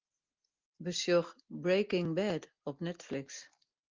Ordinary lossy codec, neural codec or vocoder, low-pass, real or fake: Opus, 32 kbps; none; 7.2 kHz; real